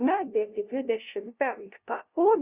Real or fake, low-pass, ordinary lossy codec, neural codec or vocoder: fake; 3.6 kHz; Opus, 64 kbps; codec, 16 kHz, 0.5 kbps, FunCodec, trained on LibriTTS, 25 frames a second